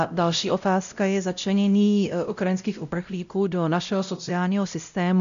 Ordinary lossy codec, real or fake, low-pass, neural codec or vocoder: MP3, 96 kbps; fake; 7.2 kHz; codec, 16 kHz, 0.5 kbps, X-Codec, WavLM features, trained on Multilingual LibriSpeech